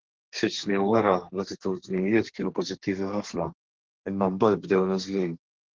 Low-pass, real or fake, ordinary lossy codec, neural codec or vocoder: 7.2 kHz; fake; Opus, 16 kbps; codec, 32 kHz, 1.9 kbps, SNAC